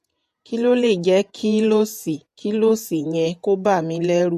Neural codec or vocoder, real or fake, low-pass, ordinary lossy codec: vocoder, 48 kHz, 128 mel bands, Vocos; fake; 14.4 kHz; MP3, 64 kbps